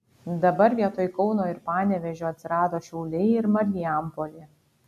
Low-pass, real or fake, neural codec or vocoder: 14.4 kHz; real; none